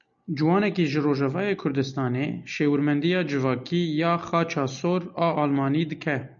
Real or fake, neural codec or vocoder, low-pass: real; none; 7.2 kHz